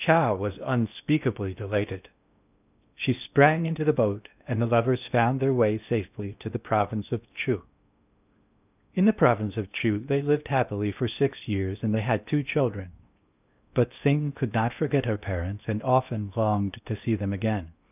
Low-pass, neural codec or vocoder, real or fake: 3.6 kHz; codec, 16 kHz in and 24 kHz out, 0.8 kbps, FocalCodec, streaming, 65536 codes; fake